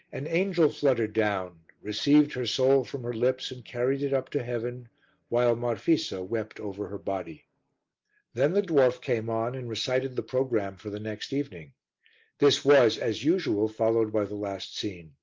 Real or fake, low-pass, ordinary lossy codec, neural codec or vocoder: real; 7.2 kHz; Opus, 32 kbps; none